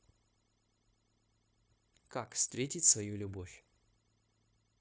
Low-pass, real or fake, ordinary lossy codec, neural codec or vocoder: none; fake; none; codec, 16 kHz, 0.9 kbps, LongCat-Audio-Codec